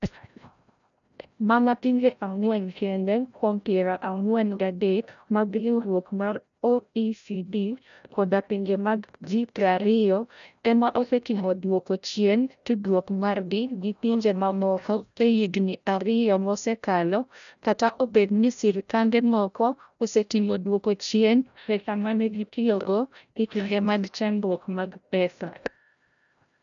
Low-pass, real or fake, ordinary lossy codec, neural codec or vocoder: 7.2 kHz; fake; none; codec, 16 kHz, 0.5 kbps, FreqCodec, larger model